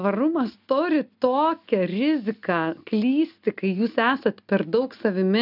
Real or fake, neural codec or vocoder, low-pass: real; none; 5.4 kHz